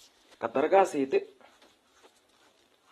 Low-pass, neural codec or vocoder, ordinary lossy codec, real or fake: 19.8 kHz; vocoder, 44.1 kHz, 128 mel bands every 512 samples, BigVGAN v2; AAC, 32 kbps; fake